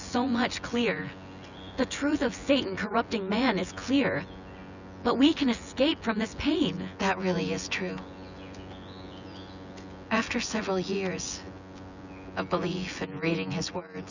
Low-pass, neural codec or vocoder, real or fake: 7.2 kHz; vocoder, 24 kHz, 100 mel bands, Vocos; fake